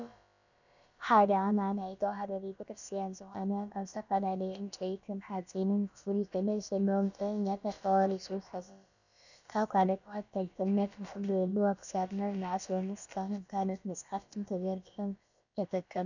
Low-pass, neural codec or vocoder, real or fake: 7.2 kHz; codec, 16 kHz, about 1 kbps, DyCAST, with the encoder's durations; fake